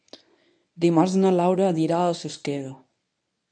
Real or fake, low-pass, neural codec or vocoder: fake; 9.9 kHz; codec, 24 kHz, 0.9 kbps, WavTokenizer, medium speech release version 1